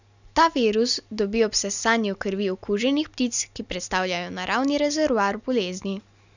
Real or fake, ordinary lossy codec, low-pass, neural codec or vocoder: real; none; 7.2 kHz; none